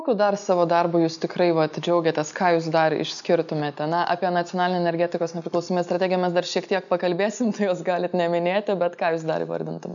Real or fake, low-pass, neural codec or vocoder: real; 7.2 kHz; none